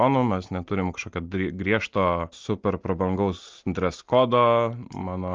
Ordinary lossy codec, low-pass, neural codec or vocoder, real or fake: Opus, 24 kbps; 7.2 kHz; none; real